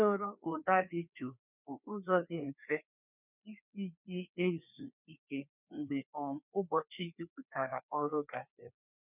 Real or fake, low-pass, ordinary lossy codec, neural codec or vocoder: fake; 3.6 kHz; none; codec, 16 kHz, 2 kbps, FreqCodec, larger model